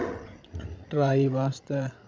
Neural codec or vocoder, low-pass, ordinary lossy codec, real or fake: codec, 16 kHz, 16 kbps, FreqCodec, larger model; none; none; fake